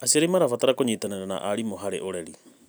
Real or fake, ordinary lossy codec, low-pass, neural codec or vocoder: real; none; none; none